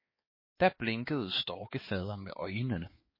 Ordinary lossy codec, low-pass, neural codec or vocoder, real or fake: MP3, 24 kbps; 5.4 kHz; codec, 16 kHz, 4 kbps, X-Codec, HuBERT features, trained on balanced general audio; fake